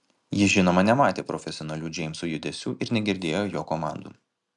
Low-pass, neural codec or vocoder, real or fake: 10.8 kHz; none; real